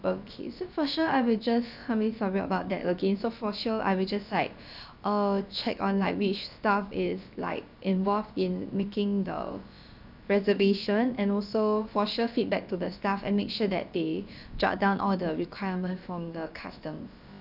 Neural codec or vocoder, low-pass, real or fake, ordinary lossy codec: codec, 16 kHz, about 1 kbps, DyCAST, with the encoder's durations; 5.4 kHz; fake; none